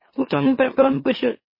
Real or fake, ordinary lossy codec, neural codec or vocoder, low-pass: fake; MP3, 24 kbps; autoencoder, 44.1 kHz, a latent of 192 numbers a frame, MeloTTS; 5.4 kHz